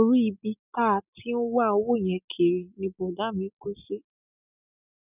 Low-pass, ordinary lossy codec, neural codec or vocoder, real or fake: 3.6 kHz; none; none; real